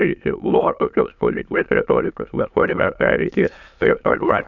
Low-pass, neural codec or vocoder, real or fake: 7.2 kHz; autoencoder, 22.05 kHz, a latent of 192 numbers a frame, VITS, trained on many speakers; fake